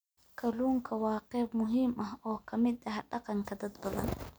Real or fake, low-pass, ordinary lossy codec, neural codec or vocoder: real; none; none; none